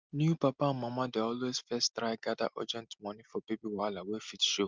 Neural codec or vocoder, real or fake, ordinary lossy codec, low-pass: none; real; Opus, 32 kbps; 7.2 kHz